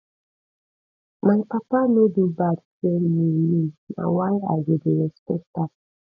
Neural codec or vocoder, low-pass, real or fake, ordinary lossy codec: none; 7.2 kHz; real; none